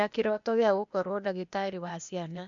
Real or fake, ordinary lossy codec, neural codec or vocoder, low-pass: fake; MP3, 64 kbps; codec, 16 kHz, 0.8 kbps, ZipCodec; 7.2 kHz